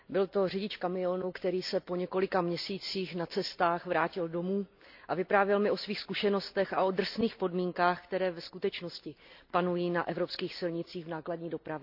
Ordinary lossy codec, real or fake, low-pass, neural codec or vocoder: AAC, 48 kbps; real; 5.4 kHz; none